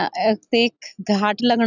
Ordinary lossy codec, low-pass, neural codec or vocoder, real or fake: none; 7.2 kHz; none; real